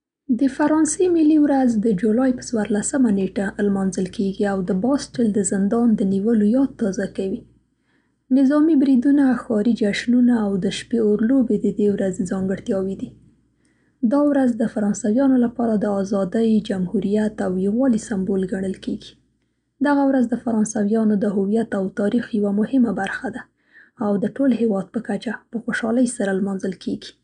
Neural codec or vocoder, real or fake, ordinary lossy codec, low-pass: none; real; none; 9.9 kHz